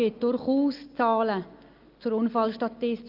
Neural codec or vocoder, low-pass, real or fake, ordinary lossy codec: none; 5.4 kHz; real; Opus, 24 kbps